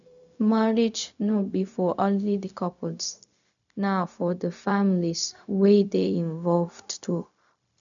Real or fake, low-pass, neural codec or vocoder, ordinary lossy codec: fake; 7.2 kHz; codec, 16 kHz, 0.4 kbps, LongCat-Audio-Codec; none